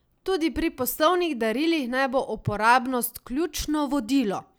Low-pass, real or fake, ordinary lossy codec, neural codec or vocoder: none; real; none; none